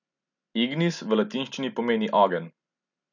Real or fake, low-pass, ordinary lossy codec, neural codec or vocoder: real; 7.2 kHz; none; none